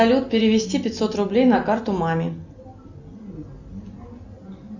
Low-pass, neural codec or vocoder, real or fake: 7.2 kHz; none; real